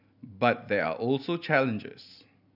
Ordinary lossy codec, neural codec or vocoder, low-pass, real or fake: none; none; 5.4 kHz; real